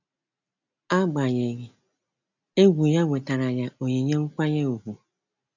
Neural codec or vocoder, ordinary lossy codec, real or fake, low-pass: none; none; real; 7.2 kHz